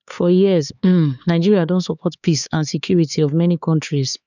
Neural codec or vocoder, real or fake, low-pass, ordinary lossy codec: codec, 16 kHz, 4 kbps, X-Codec, HuBERT features, trained on LibriSpeech; fake; 7.2 kHz; none